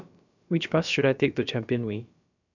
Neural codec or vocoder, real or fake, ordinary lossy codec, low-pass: codec, 16 kHz, about 1 kbps, DyCAST, with the encoder's durations; fake; none; 7.2 kHz